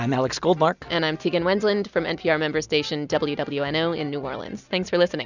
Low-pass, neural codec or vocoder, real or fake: 7.2 kHz; none; real